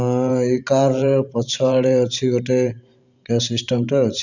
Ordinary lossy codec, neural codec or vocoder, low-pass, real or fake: none; vocoder, 44.1 kHz, 128 mel bands every 512 samples, BigVGAN v2; 7.2 kHz; fake